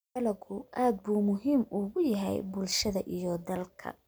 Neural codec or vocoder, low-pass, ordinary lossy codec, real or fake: none; none; none; real